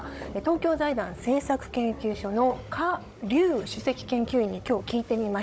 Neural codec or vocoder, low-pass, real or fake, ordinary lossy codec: codec, 16 kHz, 16 kbps, FunCodec, trained on Chinese and English, 50 frames a second; none; fake; none